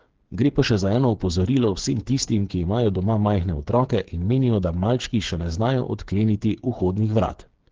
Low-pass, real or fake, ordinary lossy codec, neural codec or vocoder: 7.2 kHz; fake; Opus, 16 kbps; codec, 16 kHz, 8 kbps, FreqCodec, smaller model